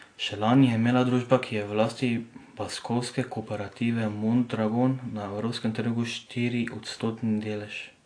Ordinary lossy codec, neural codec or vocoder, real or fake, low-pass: AAC, 48 kbps; none; real; 9.9 kHz